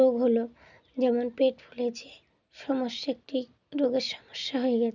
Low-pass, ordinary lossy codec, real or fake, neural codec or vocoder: 7.2 kHz; none; real; none